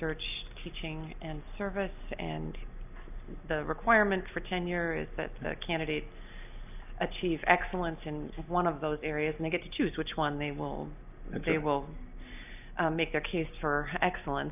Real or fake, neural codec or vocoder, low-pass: real; none; 3.6 kHz